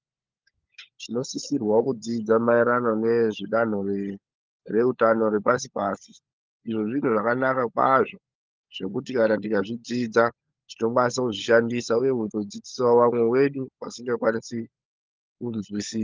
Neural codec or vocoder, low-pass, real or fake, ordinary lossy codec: codec, 16 kHz, 16 kbps, FunCodec, trained on LibriTTS, 50 frames a second; 7.2 kHz; fake; Opus, 24 kbps